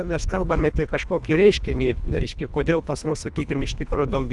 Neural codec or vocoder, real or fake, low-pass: codec, 24 kHz, 1.5 kbps, HILCodec; fake; 10.8 kHz